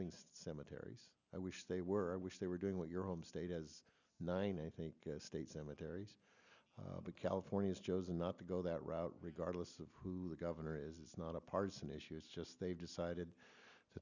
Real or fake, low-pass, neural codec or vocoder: real; 7.2 kHz; none